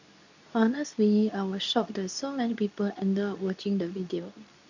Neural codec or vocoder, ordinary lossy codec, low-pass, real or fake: codec, 24 kHz, 0.9 kbps, WavTokenizer, medium speech release version 2; none; 7.2 kHz; fake